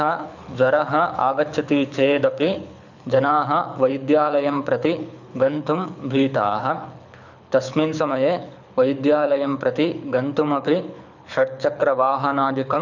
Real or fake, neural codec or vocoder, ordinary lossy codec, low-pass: fake; codec, 24 kHz, 6 kbps, HILCodec; none; 7.2 kHz